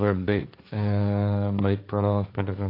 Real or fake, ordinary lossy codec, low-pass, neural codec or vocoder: fake; AAC, 32 kbps; 5.4 kHz; codec, 16 kHz, 1.1 kbps, Voila-Tokenizer